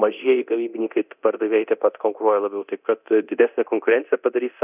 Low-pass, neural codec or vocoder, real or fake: 3.6 kHz; codec, 24 kHz, 0.9 kbps, DualCodec; fake